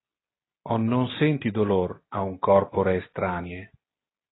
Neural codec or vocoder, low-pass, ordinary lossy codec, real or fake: none; 7.2 kHz; AAC, 16 kbps; real